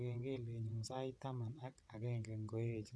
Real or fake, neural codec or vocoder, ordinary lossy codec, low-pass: fake; vocoder, 22.05 kHz, 80 mel bands, WaveNeXt; none; none